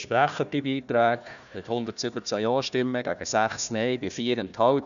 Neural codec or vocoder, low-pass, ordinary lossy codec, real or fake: codec, 16 kHz, 1 kbps, FunCodec, trained on Chinese and English, 50 frames a second; 7.2 kHz; MP3, 96 kbps; fake